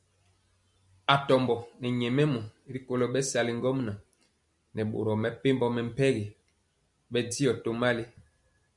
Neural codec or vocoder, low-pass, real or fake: none; 10.8 kHz; real